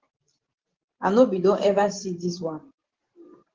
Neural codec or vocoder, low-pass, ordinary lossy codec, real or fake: vocoder, 44.1 kHz, 128 mel bands every 512 samples, BigVGAN v2; 7.2 kHz; Opus, 16 kbps; fake